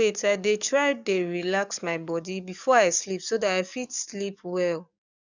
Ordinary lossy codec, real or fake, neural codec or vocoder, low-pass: none; fake; codec, 44.1 kHz, 7.8 kbps, DAC; 7.2 kHz